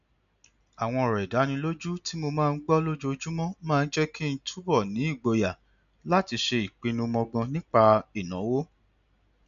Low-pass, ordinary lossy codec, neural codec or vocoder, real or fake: 7.2 kHz; AAC, 96 kbps; none; real